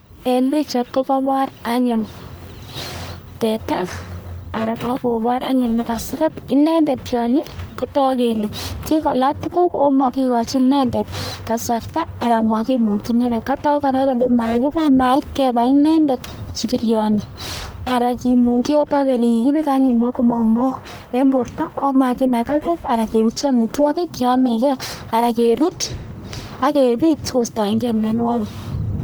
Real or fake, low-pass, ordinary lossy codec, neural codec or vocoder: fake; none; none; codec, 44.1 kHz, 1.7 kbps, Pupu-Codec